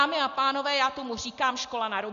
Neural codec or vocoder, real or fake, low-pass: none; real; 7.2 kHz